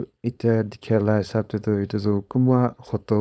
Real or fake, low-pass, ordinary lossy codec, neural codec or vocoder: fake; none; none; codec, 16 kHz, 4.8 kbps, FACodec